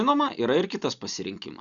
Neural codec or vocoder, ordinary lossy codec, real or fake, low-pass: none; Opus, 64 kbps; real; 7.2 kHz